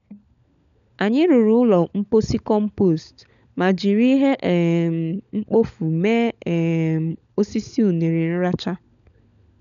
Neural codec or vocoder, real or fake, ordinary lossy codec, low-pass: codec, 16 kHz, 16 kbps, FunCodec, trained on LibriTTS, 50 frames a second; fake; none; 7.2 kHz